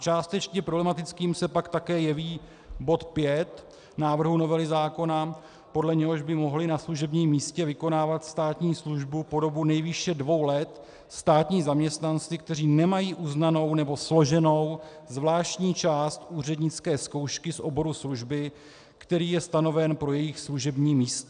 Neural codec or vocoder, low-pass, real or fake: none; 9.9 kHz; real